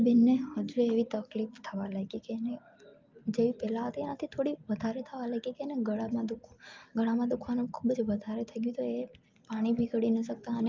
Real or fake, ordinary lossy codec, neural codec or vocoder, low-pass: real; Opus, 24 kbps; none; 7.2 kHz